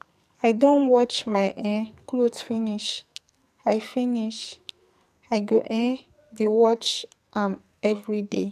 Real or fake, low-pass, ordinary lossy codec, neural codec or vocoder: fake; 14.4 kHz; MP3, 96 kbps; codec, 44.1 kHz, 2.6 kbps, SNAC